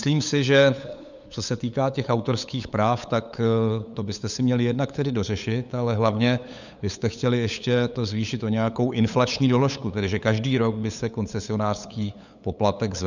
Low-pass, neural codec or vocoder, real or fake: 7.2 kHz; codec, 16 kHz, 8 kbps, FunCodec, trained on LibriTTS, 25 frames a second; fake